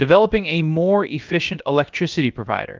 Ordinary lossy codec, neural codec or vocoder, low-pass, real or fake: Opus, 32 kbps; codec, 16 kHz, about 1 kbps, DyCAST, with the encoder's durations; 7.2 kHz; fake